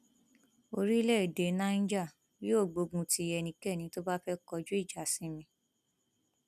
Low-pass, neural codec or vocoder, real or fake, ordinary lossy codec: 14.4 kHz; none; real; none